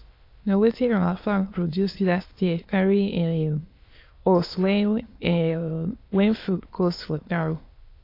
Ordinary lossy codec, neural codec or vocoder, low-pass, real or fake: AAC, 32 kbps; autoencoder, 22.05 kHz, a latent of 192 numbers a frame, VITS, trained on many speakers; 5.4 kHz; fake